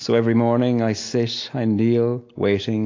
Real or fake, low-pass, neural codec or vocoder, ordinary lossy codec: real; 7.2 kHz; none; AAC, 48 kbps